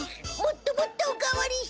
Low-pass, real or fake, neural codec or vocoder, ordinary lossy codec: none; real; none; none